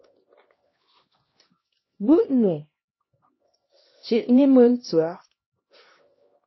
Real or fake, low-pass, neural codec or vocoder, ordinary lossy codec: fake; 7.2 kHz; codec, 16 kHz, 1 kbps, X-Codec, HuBERT features, trained on LibriSpeech; MP3, 24 kbps